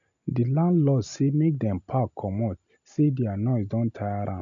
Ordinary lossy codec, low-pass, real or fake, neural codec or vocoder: none; 7.2 kHz; real; none